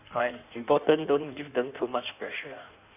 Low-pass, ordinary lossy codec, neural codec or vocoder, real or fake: 3.6 kHz; none; codec, 16 kHz in and 24 kHz out, 1.1 kbps, FireRedTTS-2 codec; fake